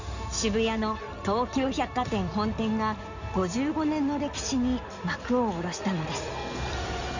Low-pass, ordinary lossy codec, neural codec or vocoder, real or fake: 7.2 kHz; none; codec, 16 kHz in and 24 kHz out, 2.2 kbps, FireRedTTS-2 codec; fake